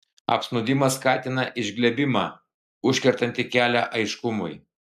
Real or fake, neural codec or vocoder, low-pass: real; none; 14.4 kHz